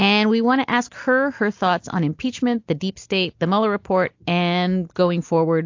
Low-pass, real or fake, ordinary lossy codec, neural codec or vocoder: 7.2 kHz; real; AAC, 48 kbps; none